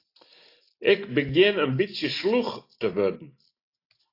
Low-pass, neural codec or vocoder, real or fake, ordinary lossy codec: 5.4 kHz; none; real; AAC, 32 kbps